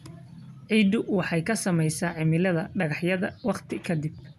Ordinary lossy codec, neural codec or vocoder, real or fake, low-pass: none; none; real; 14.4 kHz